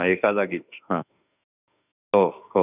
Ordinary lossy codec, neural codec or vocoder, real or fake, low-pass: none; autoencoder, 48 kHz, 32 numbers a frame, DAC-VAE, trained on Japanese speech; fake; 3.6 kHz